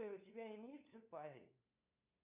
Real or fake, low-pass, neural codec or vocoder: fake; 3.6 kHz; codec, 16 kHz, 2 kbps, FunCodec, trained on LibriTTS, 25 frames a second